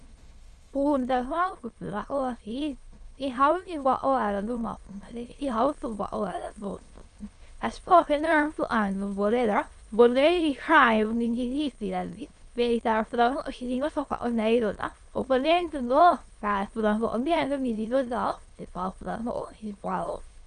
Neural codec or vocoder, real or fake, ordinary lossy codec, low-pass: autoencoder, 22.05 kHz, a latent of 192 numbers a frame, VITS, trained on many speakers; fake; Opus, 32 kbps; 9.9 kHz